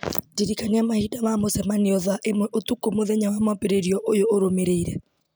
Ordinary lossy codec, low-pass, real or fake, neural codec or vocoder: none; none; real; none